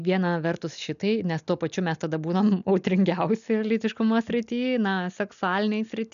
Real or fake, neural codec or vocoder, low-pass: real; none; 7.2 kHz